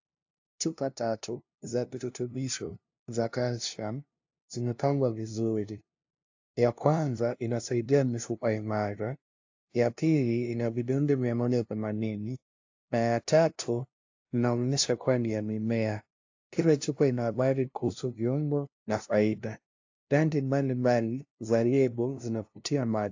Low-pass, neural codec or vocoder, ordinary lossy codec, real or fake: 7.2 kHz; codec, 16 kHz, 0.5 kbps, FunCodec, trained on LibriTTS, 25 frames a second; AAC, 48 kbps; fake